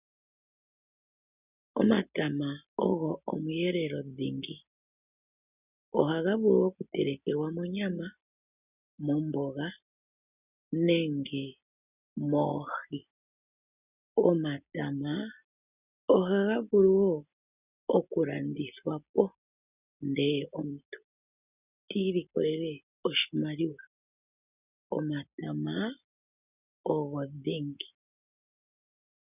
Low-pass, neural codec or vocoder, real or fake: 3.6 kHz; none; real